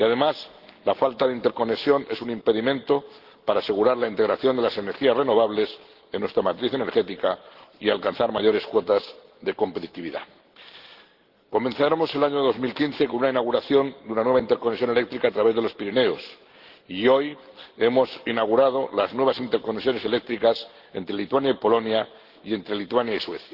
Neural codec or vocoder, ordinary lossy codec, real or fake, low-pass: none; Opus, 16 kbps; real; 5.4 kHz